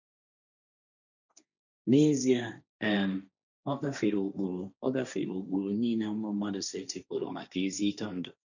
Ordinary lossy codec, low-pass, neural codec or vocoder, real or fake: none; 7.2 kHz; codec, 16 kHz, 1.1 kbps, Voila-Tokenizer; fake